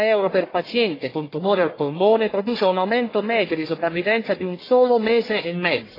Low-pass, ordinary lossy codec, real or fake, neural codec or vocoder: 5.4 kHz; AAC, 24 kbps; fake; codec, 44.1 kHz, 1.7 kbps, Pupu-Codec